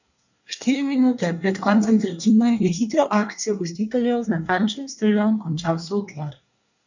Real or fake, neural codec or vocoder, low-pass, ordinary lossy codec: fake; codec, 24 kHz, 1 kbps, SNAC; 7.2 kHz; none